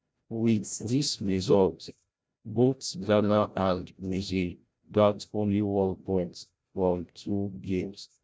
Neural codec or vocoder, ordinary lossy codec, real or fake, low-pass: codec, 16 kHz, 0.5 kbps, FreqCodec, larger model; none; fake; none